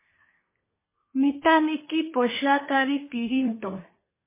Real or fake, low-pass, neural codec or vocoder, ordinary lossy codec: fake; 3.6 kHz; codec, 24 kHz, 1 kbps, SNAC; MP3, 16 kbps